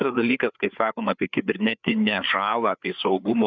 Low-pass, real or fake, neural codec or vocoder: 7.2 kHz; fake; codec, 16 kHz, 4 kbps, FreqCodec, larger model